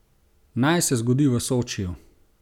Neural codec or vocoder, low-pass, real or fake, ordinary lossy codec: none; 19.8 kHz; real; none